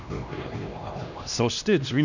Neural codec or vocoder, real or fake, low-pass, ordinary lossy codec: codec, 16 kHz, 1 kbps, X-Codec, HuBERT features, trained on LibriSpeech; fake; 7.2 kHz; none